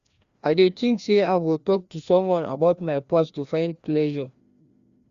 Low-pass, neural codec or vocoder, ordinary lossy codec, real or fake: 7.2 kHz; codec, 16 kHz, 1 kbps, FreqCodec, larger model; Opus, 64 kbps; fake